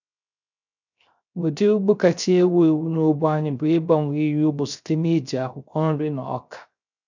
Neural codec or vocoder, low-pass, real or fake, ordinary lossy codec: codec, 16 kHz, 0.3 kbps, FocalCodec; 7.2 kHz; fake; none